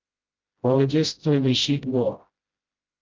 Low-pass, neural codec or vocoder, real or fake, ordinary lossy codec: 7.2 kHz; codec, 16 kHz, 0.5 kbps, FreqCodec, smaller model; fake; Opus, 24 kbps